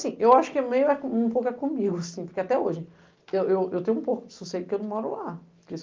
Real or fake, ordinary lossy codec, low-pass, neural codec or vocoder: real; Opus, 32 kbps; 7.2 kHz; none